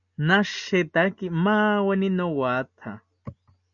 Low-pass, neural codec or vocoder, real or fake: 7.2 kHz; none; real